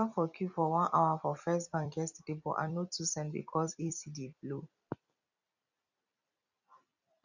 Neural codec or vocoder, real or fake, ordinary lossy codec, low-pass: vocoder, 44.1 kHz, 128 mel bands every 256 samples, BigVGAN v2; fake; none; 7.2 kHz